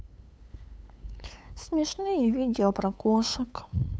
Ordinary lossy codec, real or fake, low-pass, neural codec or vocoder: none; fake; none; codec, 16 kHz, 8 kbps, FunCodec, trained on LibriTTS, 25 frames a second